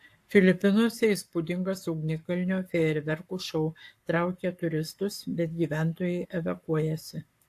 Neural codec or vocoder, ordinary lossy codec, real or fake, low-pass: codec, 44.1 kHz, 7.8 kbps, Pupu-Codec; AAC, 64 kbps; fake; 14.4 kHz